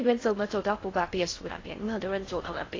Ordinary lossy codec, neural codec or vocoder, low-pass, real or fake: AAC, 32 kbps; codec, 16 kHz in and 24 kHz out, 0.6 kbps, FocalCodec, streaming, 4096 codes; 7.2 kHz; fake